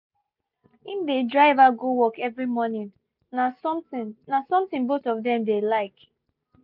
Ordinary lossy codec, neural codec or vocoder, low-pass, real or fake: none; none; 5.4 kHz; real